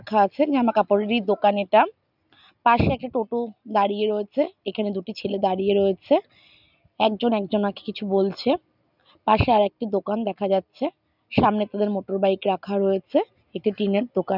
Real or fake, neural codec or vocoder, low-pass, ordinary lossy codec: real; none; 5.4 kHz; none